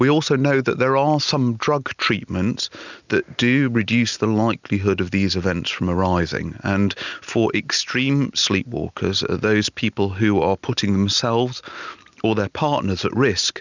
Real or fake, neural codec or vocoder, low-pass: real; none; 7.2 kHz